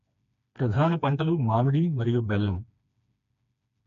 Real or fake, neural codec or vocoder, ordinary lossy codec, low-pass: fake; codec, 16 kHz, 2 kbps, FreqCodec, smaller model; none; 7.2 kHz